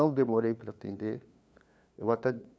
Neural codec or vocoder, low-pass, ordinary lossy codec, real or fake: codec, 16 kHz, 2 kbps, FunCodec, trained on LibriTTS, 25 frames a second; none; none; fake